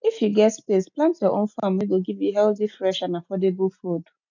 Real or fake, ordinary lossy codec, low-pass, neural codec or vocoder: real; none; 7.2 kHz; none